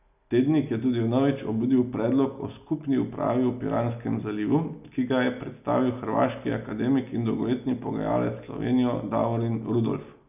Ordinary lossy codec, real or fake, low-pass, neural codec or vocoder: none; real; 3.6 kHz; none